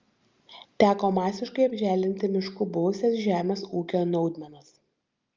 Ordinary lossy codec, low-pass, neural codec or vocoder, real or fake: Opus, 32 kbps; 7.2 kHz; none; real